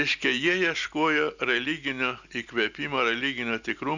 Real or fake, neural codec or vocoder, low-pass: real; none; 7.2 kHz